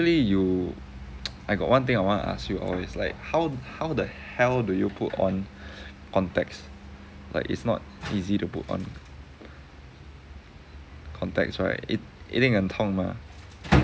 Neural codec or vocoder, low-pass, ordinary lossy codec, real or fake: none; none; none; real